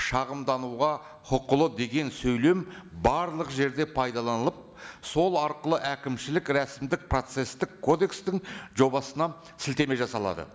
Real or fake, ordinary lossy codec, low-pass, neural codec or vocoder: real; none; none; none